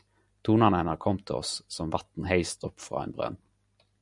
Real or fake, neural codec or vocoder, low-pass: real; none; 10.8 kHz